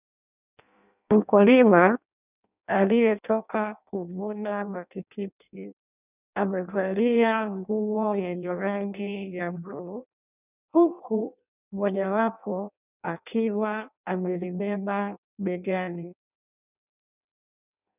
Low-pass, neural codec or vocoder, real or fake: 3.6 kHz; codec, 16 kHz in and 24 kHz out, 0.6 kbps, FireRedTTS-2 codec; fake